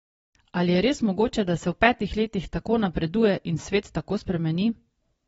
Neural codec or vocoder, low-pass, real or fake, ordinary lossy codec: none; 19.8 kHz; real; AAC, 24 kbps